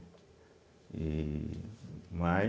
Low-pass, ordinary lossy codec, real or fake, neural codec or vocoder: none; none; real; none